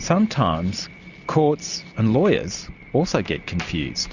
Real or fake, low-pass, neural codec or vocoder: real; 7.2 kHz; none